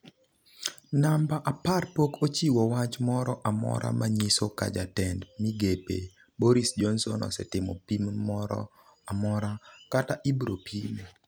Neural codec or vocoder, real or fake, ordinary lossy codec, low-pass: none; real; none; none